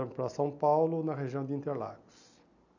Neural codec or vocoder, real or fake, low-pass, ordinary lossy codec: none; real; 7.2 kHz; none